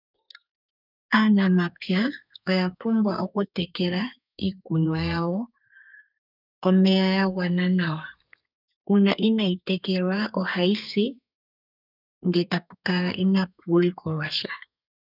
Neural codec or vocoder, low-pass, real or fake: codec, 32 kHz, 1.9 kbps, SNAC; 5.4 kHz; fake